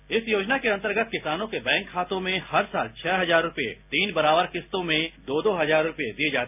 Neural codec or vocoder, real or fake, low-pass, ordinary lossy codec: none; real; 3.6 kHz; none